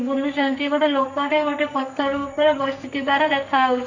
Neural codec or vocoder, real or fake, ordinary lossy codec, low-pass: codec, 44.1 kHz, 2.6 kbps, SNAC; fake; none; 7.2 kHz